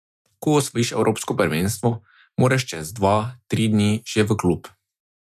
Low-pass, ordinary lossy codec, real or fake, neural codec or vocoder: 14.4 kHz; MP3, 96 kbps; real; none